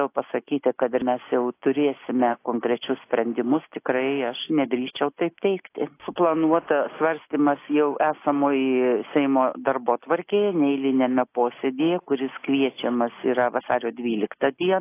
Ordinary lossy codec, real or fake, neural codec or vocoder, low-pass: AAC, 24 kbps; real; none; 3.6 kHz